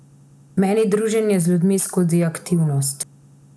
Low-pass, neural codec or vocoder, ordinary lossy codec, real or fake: none; none; none; real